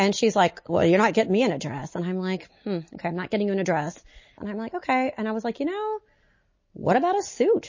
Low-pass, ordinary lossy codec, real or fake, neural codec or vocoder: 7.2 kHz; MP3, 32 kbps; real; none